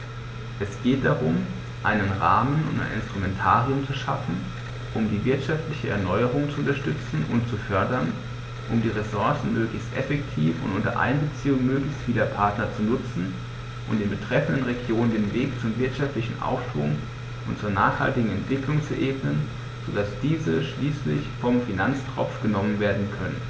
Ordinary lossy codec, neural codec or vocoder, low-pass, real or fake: none; none; none; real